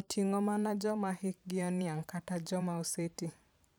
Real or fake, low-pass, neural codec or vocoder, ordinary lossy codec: fake; none; vocoder, 44.1 kHz, 128 mel bands every 512 samples, BigVGAN v2; none